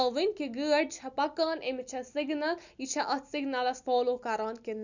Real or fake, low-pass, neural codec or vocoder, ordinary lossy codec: real; 7.2 kHz; none; none